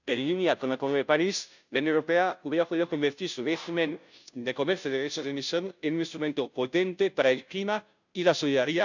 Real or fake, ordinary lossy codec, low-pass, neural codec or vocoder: fake; none; 7.2 kHz; codec, 16 kHz, 0.5 kbps, FunCodec, trained on Chinese and English, 25 frames a second